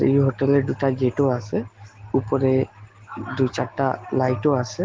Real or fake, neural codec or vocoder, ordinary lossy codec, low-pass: real; none; Opus, 16 kbps; 7.2 kHz